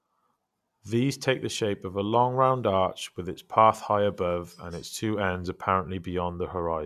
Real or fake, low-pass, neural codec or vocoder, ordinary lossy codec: real; 14.4 kHz; none; none